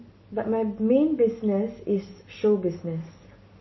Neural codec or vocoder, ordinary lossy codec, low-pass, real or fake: none; MP3, 24 kbps; 7.2 kHz; real